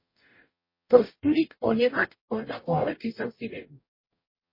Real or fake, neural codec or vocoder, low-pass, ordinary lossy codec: fake; codec, 44.1 kHz, 0.9 kbps, DAC; 5.4 kHz; MP3, 24 kbps